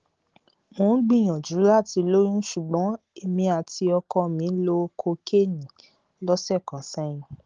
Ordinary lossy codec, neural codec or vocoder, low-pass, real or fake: Opus, 16 kbps; none; 7.2 kHz; real